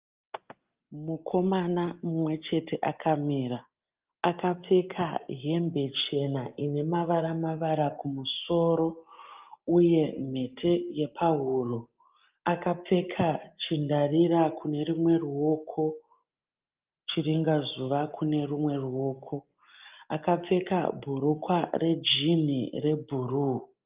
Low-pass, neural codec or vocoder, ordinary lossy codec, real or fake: 3.6 kHz; none; Opus, 32 kbps; real